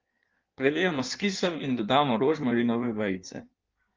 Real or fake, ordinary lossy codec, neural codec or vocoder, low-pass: fake; Opus, 32 kbps; codec, 16 kHz in and 24 kHz out, 1.1 kbps, FireRedTTS-2 codec; 7.2 kHz